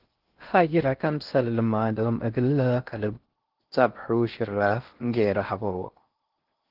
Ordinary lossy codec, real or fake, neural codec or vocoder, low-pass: Opus, 16 kbps; fake; codec, 16 kHz in and 24 kHz out, 0.6 kbps, FocalCodec, streaming, 2048 codes; 5.4 kHz